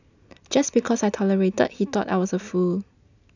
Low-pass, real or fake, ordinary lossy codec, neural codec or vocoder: 7.2 kHz; real; none; none